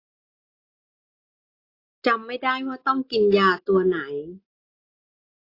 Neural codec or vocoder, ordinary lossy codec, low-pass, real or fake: none; AAC, 32 kbps; 5.4 kHz; real